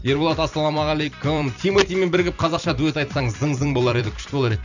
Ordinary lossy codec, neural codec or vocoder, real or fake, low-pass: none; vocoder, 44.1 kHz, 128 mel bands every 256 samples, BigVGAN v2; fake; 7.2 kHz